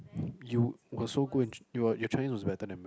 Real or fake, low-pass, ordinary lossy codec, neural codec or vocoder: real; none; none; none